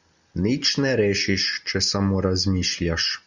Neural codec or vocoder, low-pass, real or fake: none; 7.2 kHz; real